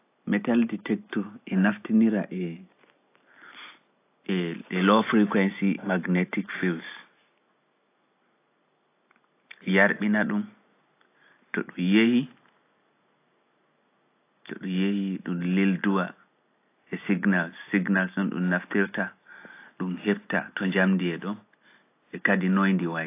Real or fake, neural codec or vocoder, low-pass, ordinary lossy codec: real; none; 3.6 kHz; AAC, 24 kbps